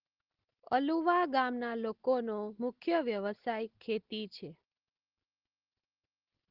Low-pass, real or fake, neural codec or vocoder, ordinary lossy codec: 5.4 kHz; real; none; Opus, 16 kbps